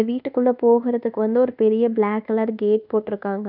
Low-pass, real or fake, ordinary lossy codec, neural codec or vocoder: 5.4 kHz; fake; none; codec, 24 kHz, 1.2 kbps, DualCodec